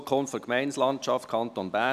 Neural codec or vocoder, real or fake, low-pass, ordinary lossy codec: none; real; 14.4 kHz; none